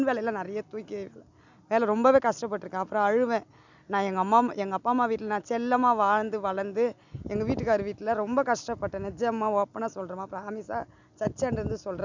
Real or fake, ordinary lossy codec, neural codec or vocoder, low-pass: real; none; none; 7.2 kHz